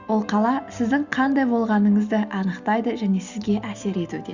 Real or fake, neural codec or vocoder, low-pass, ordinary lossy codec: real; none; 7.2 kHz; Opus, 64 kbps